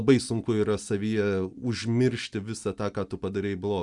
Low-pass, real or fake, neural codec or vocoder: 10.8 kHz; real; none